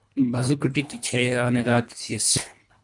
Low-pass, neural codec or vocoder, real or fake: 10.8 kHz; codec, 24 kHz, 1.5 kbps, HILCodec; fake